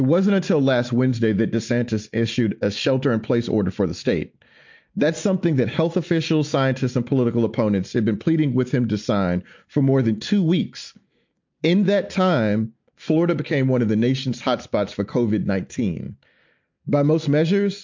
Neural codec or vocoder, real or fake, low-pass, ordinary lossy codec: none; real; 7.2 kHz; MP3, 48 kbps